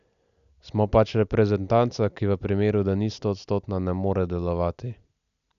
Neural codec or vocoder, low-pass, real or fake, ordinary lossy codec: none; 7.2 kHz; real; none